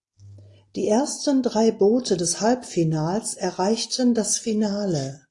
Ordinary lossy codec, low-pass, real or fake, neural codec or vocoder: AAC, 48 kbps; 10.8 kHz; real; none